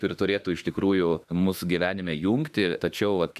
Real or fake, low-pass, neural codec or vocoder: fake; 14.4 kHz; autoencoder, 48 kHz, 32 numbers a frame, DAC-VAE, trained on Japanese speech